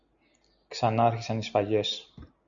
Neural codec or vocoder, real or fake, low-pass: none; real; 7.2 kHz